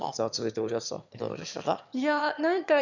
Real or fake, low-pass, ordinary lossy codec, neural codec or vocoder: fake; 7.2 kHz; none; autoencoder, 22.05 kHz, a latent of 192 numbers a frame, VITS, trained on one speaker